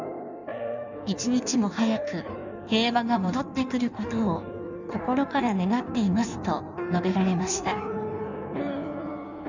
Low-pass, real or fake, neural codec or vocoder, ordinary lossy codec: 7.2 kHz; fake; codec, 16 kHz in and 24 kHz out, 1.1 kbps, FireRedTTS-2 codec; none